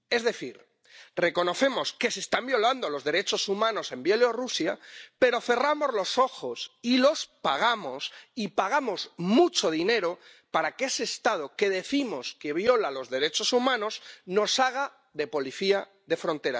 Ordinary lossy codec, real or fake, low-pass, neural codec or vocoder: none; real; none; none